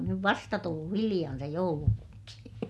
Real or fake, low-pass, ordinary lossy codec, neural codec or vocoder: real; none; none; none